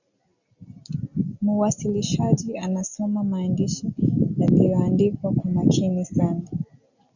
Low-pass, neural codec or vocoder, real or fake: 7.2 kHz; none; real